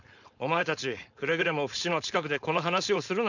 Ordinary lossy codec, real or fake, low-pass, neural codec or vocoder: none; fake; 7.2 kHz; codec, 16 kHz, 4.8 kbps, FACodec